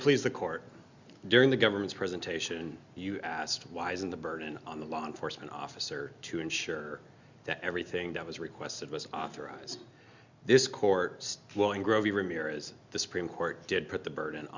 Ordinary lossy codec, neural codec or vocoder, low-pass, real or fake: Opus, 64 kbps; none; 7.2 kHz; real